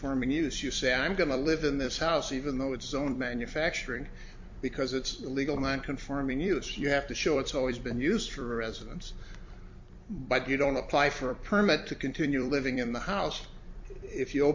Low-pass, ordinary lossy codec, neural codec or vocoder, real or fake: 7.2 kHz; MP3, 48 kbps; vocoder, 44.1 kHz, 128 mel bands every 256 samples, BigVGAN v2; fake